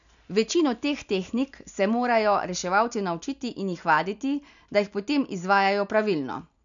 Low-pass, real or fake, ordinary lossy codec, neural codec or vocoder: 7.2 kHz; real; none; none